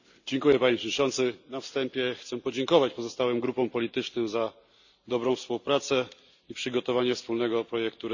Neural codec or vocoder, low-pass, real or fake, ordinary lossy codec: none; 7.2 kHz; real; none